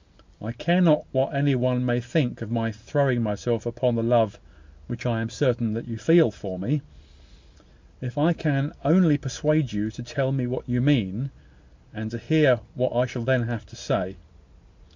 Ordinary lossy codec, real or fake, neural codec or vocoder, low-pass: MP3, 64 kbps; real; none; 7.2 kHz